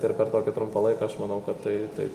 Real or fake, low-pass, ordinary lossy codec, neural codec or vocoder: real; 14.4 kHz; Opus, 32 kbps; none